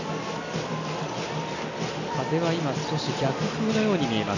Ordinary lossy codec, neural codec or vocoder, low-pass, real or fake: none; none; 7.2 kHz; real